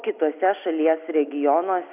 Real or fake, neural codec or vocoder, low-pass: real; none; 3.6 kHz